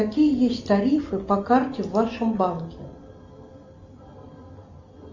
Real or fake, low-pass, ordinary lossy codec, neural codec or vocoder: real; 7.2 kHz; Opus, 64 kbps; none